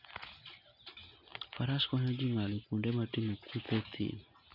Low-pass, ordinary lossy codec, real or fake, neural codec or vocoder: 5.4 kHz; none; real; none